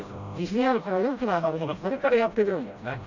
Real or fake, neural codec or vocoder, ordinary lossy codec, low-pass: fake; codec, 16 kHz, 0.5 kbps, FreqCodec, smaller model; none; 7.2 kHz